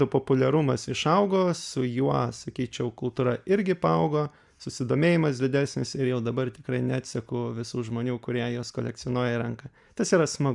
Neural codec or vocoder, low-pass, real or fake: none; 10.8 kHz; real